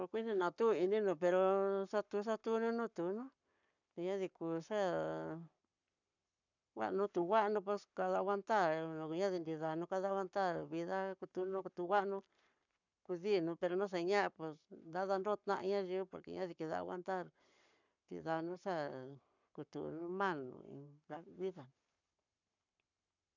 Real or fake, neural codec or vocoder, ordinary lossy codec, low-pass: fake; codec, 44.1 kHz, 7.8 kbps, Pupu-Codec; none; 7.2 kHz